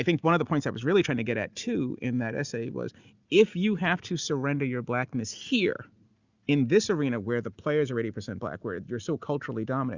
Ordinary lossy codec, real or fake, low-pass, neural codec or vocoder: Opus, 64 kbps; real; 7.2 kHz; none